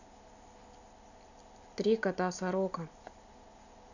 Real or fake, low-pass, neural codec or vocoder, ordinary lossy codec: real; 7.2 kHz; none; none